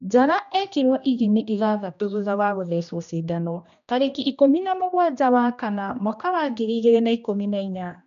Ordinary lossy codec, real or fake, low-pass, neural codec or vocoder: none; fake; 7.2 kHz; codec, 16 kHz, 1 kbps, X-Codec, HuBERT features, trained on general audio